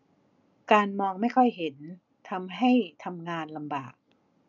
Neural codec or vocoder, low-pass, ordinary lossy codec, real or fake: none; 7.2 kHz; none; real